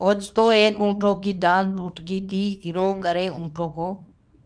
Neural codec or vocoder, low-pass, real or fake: codec, 24 kHz, 0.9 kbps, WavTokenizer, small release; 9.9 kHz; fake